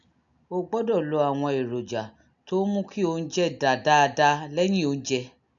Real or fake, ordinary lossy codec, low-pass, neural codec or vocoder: real; none; 7.2 kHz; none